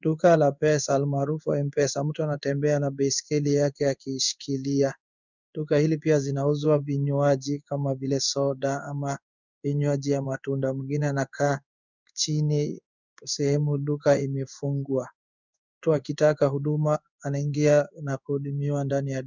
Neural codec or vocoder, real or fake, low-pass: codec, 16 kHz in and 24 kHz out, 1 kbps, XY-Tokenizer; fake; 7.2 kHz